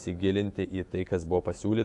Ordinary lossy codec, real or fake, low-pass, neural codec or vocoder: AAC, 64 kbps; fake; 10.8 kHz; autoencoder, 48 kHz, 128 numbers a frame, DAC-VAE, trained on Japanese speech